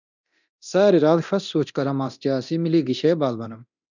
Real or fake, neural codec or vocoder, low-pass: fake; codec, 24 kHz, 0.9 kbps, DualCodec; 7.2 kHz